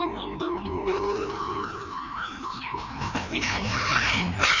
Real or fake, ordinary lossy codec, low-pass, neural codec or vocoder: fake; none; 7.2 kHz; codec, 16 kHz, 1 kbps, FreqCodec, larger model